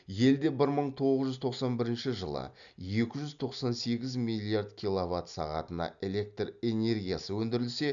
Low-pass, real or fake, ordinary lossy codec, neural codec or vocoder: 7.2 kHz; real; none; none